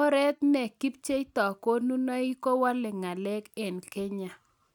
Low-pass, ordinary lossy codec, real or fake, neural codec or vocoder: 19.8 kHz; none; real; none